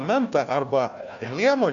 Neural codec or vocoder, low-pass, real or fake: codec, 16 kHz, 1 kbps, FunCodec, trained on Chinese and English, 50 frames a second; 7.2 kHz; fake